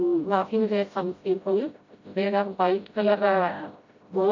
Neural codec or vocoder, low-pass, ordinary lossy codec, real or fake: codec, 16 kHz, 0.5 kbps, FreqCodec, smaller model; 7.2 kHz; MP3, 48 kbps; fake